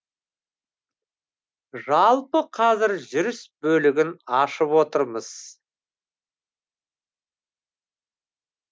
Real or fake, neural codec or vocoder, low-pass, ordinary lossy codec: real; none; none; none